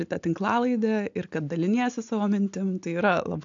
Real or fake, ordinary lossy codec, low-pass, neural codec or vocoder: real; AAC, 64 kbps; 7.2 kHz; none